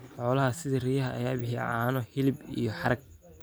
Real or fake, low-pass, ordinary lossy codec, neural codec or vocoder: real; none; none; none